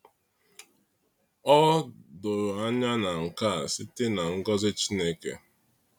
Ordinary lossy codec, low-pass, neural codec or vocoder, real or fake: none; 19.8 kHz; none; real